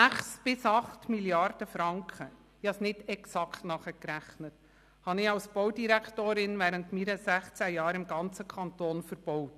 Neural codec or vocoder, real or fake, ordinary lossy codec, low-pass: none; real; none; 14.4 kHz